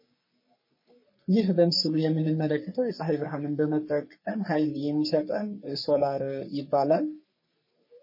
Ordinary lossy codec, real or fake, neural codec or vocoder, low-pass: MP3, 24 kbps; fake; codec, 44.1 kHz, 3.4 kbps, Pupu-Codec; 5.4 kHz